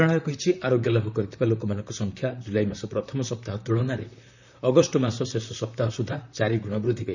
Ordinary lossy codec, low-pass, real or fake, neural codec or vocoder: none; 7.2 kHz; fake; vocoder, 44.1 kHz, 128 mel bands, Pupu-Vocoder